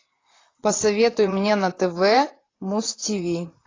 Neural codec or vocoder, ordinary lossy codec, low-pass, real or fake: vocoder, 44.1 kHz, 128 mel bands, Pupu-Vocoder; AAC, 32 kbps; 7.2 kHz; fake